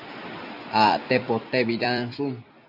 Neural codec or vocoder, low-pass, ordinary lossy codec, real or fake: vocoder, 44.1 kHz, 128 mel bands every 256 samples, BigVGAN v2; 5.4 kHz; AAC, 48 kbps; fake